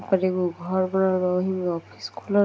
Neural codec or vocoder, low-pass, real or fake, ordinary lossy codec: none; none; real; none